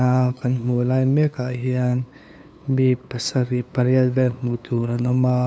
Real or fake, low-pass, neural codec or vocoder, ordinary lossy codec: fake; none; codec, 16 kHz, 2 kbps, FunCodec, trained on LibriTTS, 25 frames a second; none